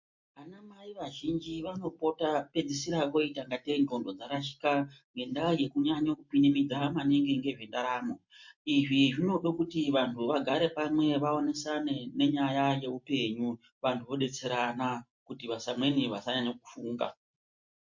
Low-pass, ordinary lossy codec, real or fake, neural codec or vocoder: 7.2 kHz; MP3, 48 kbps; real; none